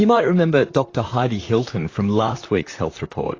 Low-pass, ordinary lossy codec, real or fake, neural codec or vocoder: 7.2 kHz; AAC, 32 kbps; fake; vocoder, 44.1 kHz, 128 mel bands, Pupu-Vocoder